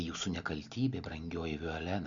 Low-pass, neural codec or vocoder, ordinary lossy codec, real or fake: 7.2 kHz; none; Opus, 64 kbps; real